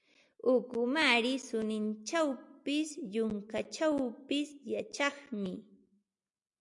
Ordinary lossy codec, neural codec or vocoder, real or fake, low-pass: MP3, 64 kbps; none; real; 10.8 kHz